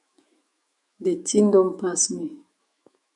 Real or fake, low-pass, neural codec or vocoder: fake; 10.8 kHz; autoencoder, 48 kHz, 128 numbers a frame, DAC-VAE, trained on Japanese speech